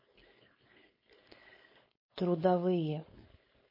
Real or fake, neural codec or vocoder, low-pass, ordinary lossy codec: fake; codec, 16 kHz, 4.8 kbps, FACodec; 5.4 kHz; MP3, 24 kbps